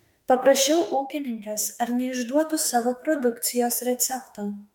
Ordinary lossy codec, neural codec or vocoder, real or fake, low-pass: MP3, 96 kbps; autoencoder, 48 kHz, 32 numbers a frame, DAC-VAE, trained on Japanese speech; fake; 19.8 kHz